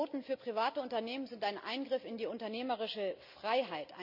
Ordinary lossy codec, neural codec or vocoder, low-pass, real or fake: none; none; 5.4 kHz; real